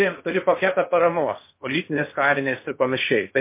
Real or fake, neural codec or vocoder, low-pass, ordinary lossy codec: fake; codec, 16 kHz in and 24 kHz out, 0.8 kbps, FocalCodec, streaming, 65536 codes; 3.6 kHz; MP3, 24 kbps